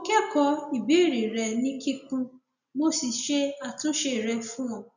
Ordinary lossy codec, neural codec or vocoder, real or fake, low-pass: none; none; real; 7.2 kHz